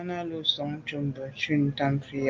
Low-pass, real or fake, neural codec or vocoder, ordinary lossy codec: 7.2 kHz; real; none; Opus, 32 kbps